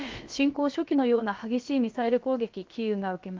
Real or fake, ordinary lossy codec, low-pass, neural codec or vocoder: fake; Opus, 32 kbps; 7.2 kHz; codec, 16 kHz, about 1 kbps, DyCAST, with the encoder's durations